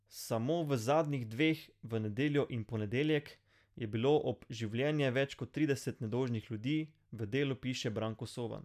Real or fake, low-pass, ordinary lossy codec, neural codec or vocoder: real; 14.4 kHz; none; none